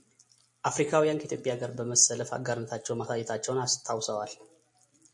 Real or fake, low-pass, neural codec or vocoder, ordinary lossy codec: real; 10.8 kHz; none; MP3, 48 kbps